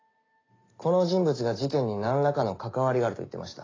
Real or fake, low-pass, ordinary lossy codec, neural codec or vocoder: real; 7.2 kHz; AAC, 32 kbps; none